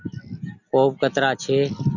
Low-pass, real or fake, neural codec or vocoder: 7.2 kHz; real; none